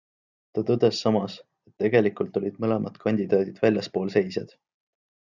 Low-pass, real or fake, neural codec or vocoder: 7.2 kHz; real; none